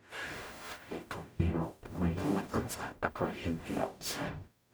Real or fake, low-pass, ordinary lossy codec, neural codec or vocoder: fake; none; none; codec, 44.1 kHz, 0.9 kbps, DAC